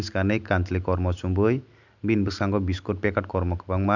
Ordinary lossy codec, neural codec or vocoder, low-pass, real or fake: none; none; 7.2 kHz; real